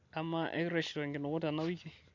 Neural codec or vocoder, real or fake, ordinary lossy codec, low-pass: none; real; MP3, 48 kbps; 7.2 kHz